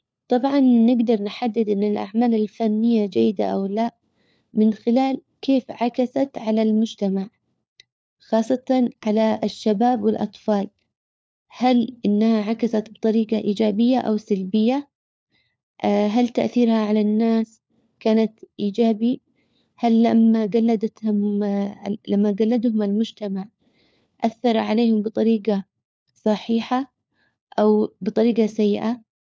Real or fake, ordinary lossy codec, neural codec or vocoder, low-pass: fake; none; codec, 16 kHz, 4 kbps, FunCodec, trained on LibriTTS, 50 frames a second; none